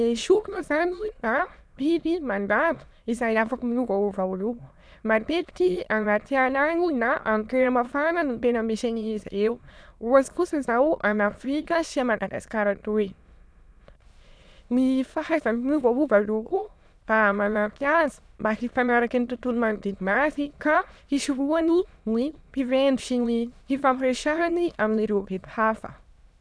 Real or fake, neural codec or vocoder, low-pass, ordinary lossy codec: fake; autoencoder, 22.05 kHz, a latent of 192 numbers a frame, VITS, trained on many speakers; none; none